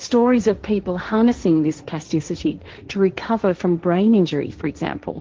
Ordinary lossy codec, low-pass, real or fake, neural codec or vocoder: Opus, 16 kbps; 7.2 kHz; fake; codec, 16 kHz, 1.1 kbps, Voila-Tokenizer